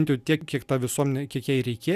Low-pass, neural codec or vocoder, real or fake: 14.4 kHz; none; real